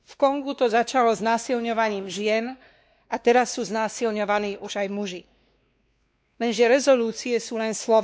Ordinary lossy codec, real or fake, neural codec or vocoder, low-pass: none; fake; codec, 16 kHz, 2 kbps, X-Codec, WavLM features, trained on Multilingual LibriSpeech; none